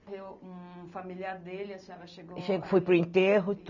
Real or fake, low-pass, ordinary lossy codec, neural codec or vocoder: real; 7.2 kHz; none; none